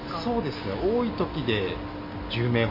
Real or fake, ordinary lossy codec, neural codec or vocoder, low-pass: real; MP3, 24 kbps; none; 5.4 kHz